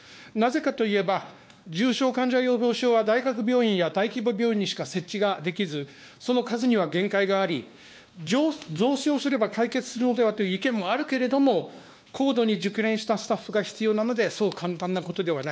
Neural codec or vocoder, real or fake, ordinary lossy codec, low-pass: codec, 16 kHz, 2 kbps, X-Codec, WavLM features, trained on Multilingual LibriSpeech; fake; none; none